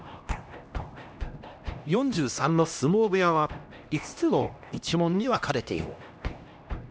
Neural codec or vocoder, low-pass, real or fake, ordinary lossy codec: codec, 16 kHz, 1 kbps, X-Codec, HuBERT features, trained on LibriSpeech; none; fake; none